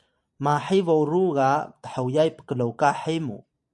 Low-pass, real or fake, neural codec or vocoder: 10.8 kHz; fake; vocoder, 44.1 kHz, 128 mel bands every 512 samples, BigVGAN v2